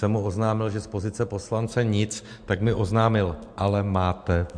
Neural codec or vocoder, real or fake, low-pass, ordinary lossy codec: none; real; 9.9 kHz; MP3, 64 kbps